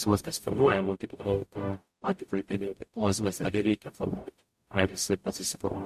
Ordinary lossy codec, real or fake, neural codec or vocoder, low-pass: MP3, 64 kbps; fake; codec, 44.1 kHz, 0.9 kbps, DAC; 14.4 kHz